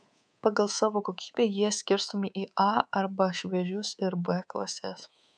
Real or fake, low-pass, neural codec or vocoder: fake; 9.9 kHz; codec, 24 kHz, 3.1 kbps, DualCodec